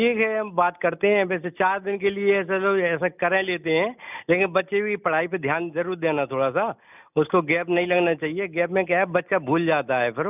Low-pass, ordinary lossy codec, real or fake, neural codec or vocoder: 3.6 kHz; none; real; none